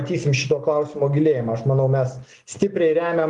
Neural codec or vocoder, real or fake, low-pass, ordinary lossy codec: none; real; 10.8 kHz; Opus, 24 kbps